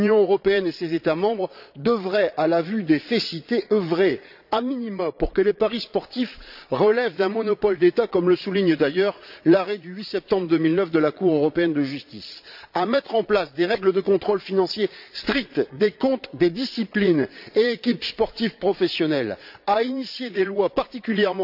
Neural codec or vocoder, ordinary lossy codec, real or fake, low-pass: vocoder, 22.05 kHz, 80 mel bands, Vocos; AAC, 48 kbps; fake; 5.4 kHz